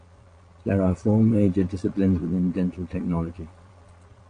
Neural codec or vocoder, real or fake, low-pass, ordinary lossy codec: vocoder, 22.05 kHz, 80 mel bands, Vocos; fake; 9.9 kHz; AAC, 48 kbps